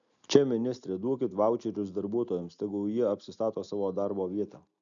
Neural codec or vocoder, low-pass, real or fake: none; 7.2 kHz; real